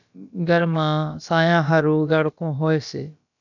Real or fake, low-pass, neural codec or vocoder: fake; 7.2 kHz; codec, 16 kHz, about 1 kbps, DyCAST, with the encoder's durations